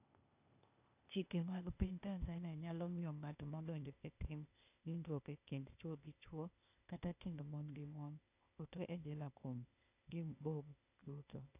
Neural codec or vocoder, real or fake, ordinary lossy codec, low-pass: codec, 16 kHz, 0.8 kbps, ZipCodec; fake; none; 3.6 kHz